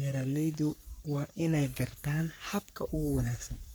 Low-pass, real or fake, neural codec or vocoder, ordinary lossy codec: none; fake; codec, 44.1 kHz, 3.4 kbps, Pupu-Codec; none